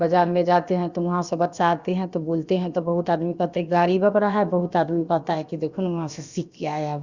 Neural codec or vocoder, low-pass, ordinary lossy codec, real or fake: codec, 16 kHz, about 1 kbps, DyCAST, with the encoder's durations; 7.2 kHz; Opus, 64 kbps; fake